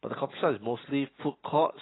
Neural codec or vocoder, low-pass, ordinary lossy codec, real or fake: none; 7.2 kHz; AAC, 16 kbps; real